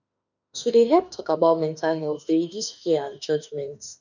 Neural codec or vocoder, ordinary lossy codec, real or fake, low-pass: autoencoder, 48 kHz, 32 numbers a frame, DAC-VAE, trained on Japanese speech; none; fake; 7.2 kHz